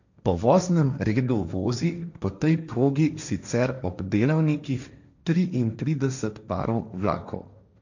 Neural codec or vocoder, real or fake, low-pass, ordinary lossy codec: codec, 16 kHz, 1.1 kbps, Voila-Tokenizer; fake; 7.2 kHz; none